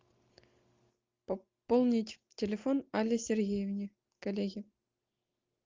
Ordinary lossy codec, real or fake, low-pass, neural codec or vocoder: Opus, 32 kbps; real; 7.2 kHz; none